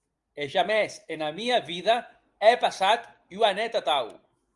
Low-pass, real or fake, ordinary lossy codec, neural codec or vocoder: 10.8 kHz; real; Opus, 32 kbps; none